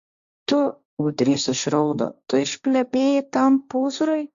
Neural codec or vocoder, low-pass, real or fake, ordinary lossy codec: codec, 16 kHz, 1.1 kbps, Voila-Tokenizer; 7.2 kHz; fake; Opus, 64 kbps